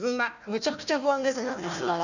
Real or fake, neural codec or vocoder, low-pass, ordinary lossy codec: fake; codec, 16 kHz, 1 kbps, FunCodec, trained on Chinese and English, 50 frames a second; 7.2 kHz; none